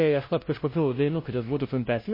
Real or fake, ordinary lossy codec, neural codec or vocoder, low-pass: fake; MP3, 24 kbps; codec, 16 kHz, 0.5 kbps, FunCodec, trained on LibriTTS, 25 frames a second; 5.4 kHz